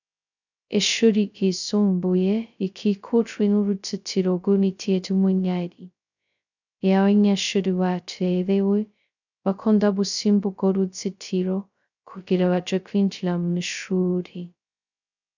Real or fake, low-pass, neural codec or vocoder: fake; 7.2 kHz; codec, 16 kHz, 0.2 kbps, FocalCodec